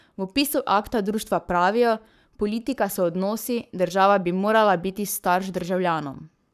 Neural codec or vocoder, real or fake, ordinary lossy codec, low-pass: codec, 44.1 kHz, 7.8 kbps, Pupu-Codec; fake; none; 14.4 kHz